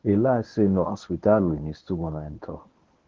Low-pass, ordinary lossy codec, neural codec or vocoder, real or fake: 7.2 kHz; Opus, 16 kbps; codec, 24 kHz, 0.9 kbps, WavTokenizer, medium speech release version 1; fake